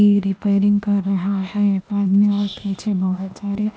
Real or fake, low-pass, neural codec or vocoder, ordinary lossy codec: fake; none; codec, 16 kHz, 0.8 kbps, ZipCodec; none